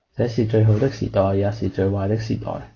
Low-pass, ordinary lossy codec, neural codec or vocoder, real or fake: 7.2 kHz; AAC, 32 kbps; none; real